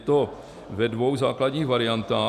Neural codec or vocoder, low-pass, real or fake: none; 14.4 kHz; real